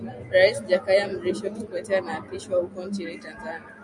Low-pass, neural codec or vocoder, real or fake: 10.8 kHz; none; real